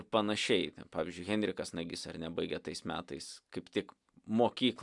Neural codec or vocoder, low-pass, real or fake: none; 10.8 kHz; real